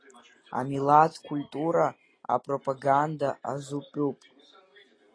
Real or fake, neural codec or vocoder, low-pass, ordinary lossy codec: real; none; 9.9 kHz; AAC, 32 kbps